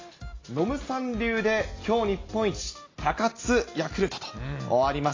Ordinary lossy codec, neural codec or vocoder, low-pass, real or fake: AAC, 32 kbps; none; 7.2 kHz; real